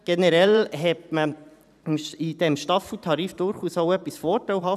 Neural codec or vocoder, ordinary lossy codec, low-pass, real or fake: vocoder, 44.1 kHz, 128 mel bands every 512 samples, BigVGAN v2; none; 14.4 kHz; fake